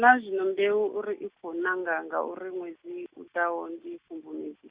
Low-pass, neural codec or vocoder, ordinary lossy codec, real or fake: 3.6 kHz; none; Opus, 64 kbps; real